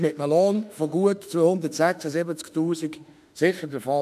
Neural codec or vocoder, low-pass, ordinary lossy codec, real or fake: autoencoder, 48 kHz, 32 numbers a frame, DAC-VAE, trained on Japanese speech; 14.4 kHz; none; fake